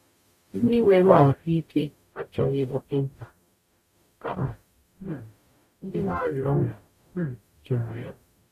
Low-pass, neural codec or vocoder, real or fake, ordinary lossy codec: 14.4 kHz; codec, 44.1 kHz, 0.9 kbps, DAC; fake; none